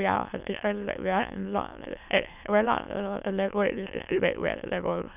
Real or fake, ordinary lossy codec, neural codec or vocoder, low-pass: fake; none; autoencoder, 22.05 kHz, a latent of 192 numbers a frame, VITS, trained on many speakers; 3.6 kHz